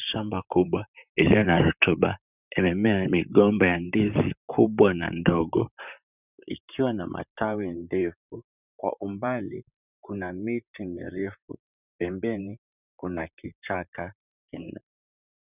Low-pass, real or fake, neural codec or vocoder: 3.6 kHz; fake; vocoder, 44.1 kHz, 128 mel bands, Pupu-Vocoder